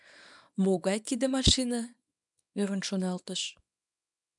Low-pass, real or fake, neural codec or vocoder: 10.8 kHz; fake; codec, 24 kHz, 0.9 kbps, WavTokenizer, small release